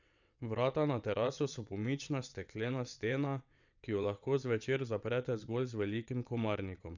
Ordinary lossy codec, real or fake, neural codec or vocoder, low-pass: none; fake; vocoder, 22.05 kHz, 80 mel bands, WaveNeXt; 7.2 kHz